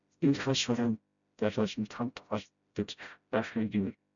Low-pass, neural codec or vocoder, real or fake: 7.2 kHz; codec, 16 kHz, 0.5 kbps, FreqCodec, smaller model; fake